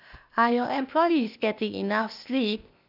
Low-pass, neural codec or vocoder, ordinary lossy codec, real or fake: 5.4 kHz; codec, 16 kHz, 0.8 kbps, ZipCodec; none; fake